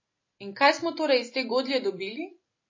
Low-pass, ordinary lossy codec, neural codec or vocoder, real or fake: 7.2 kHz; MP3, 32 kbps; none; real